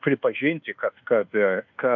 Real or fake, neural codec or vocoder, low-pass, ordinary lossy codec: fake; codec, 24 kHz, 1.2 kbps, DualCodec; 7.2 kHz; MP3, 64 kbps